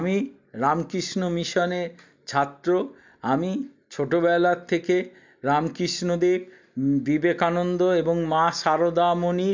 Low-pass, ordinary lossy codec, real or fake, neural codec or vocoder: 7.2 kHz; MP3, 64 kbps; real; none